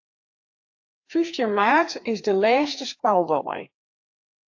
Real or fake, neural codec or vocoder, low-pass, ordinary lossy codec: fake; codec, 16 kHz, 2 kbps, FreqCodec, larger model; 7.2 kHz; AAC, 48 kbps